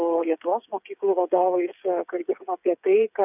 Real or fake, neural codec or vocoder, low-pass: fake; codec, 16 kHz, 8 kbps, FreqCodec, smaller model; 3.6 kHz